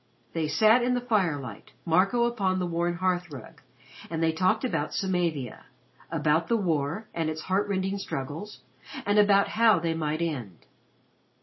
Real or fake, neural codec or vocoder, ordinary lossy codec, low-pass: real; none; MP3, 24 kbps; 7.2 kHz